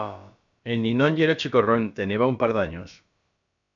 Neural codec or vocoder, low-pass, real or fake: codec, 16 kHz, about 1 kbps, DyCAST, with the encoder's durations; 7.2 kHz; fake